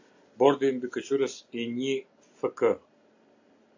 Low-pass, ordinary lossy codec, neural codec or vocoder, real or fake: 7.2 kHz; AAC, 48 kbps; none; real